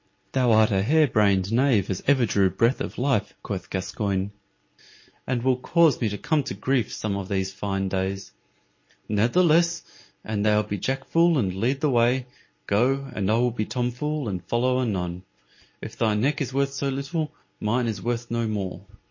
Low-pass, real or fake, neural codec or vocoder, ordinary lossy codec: 7.2 kHz; real; none; MP3, 32 kbps